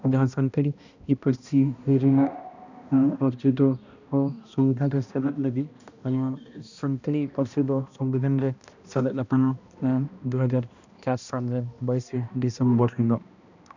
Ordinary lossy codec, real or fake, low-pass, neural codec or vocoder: none; fake; 7.2 kHz; codec, 16 kHz, 1 kbps, X-Codec, HuBERT features, trained on balanced general audio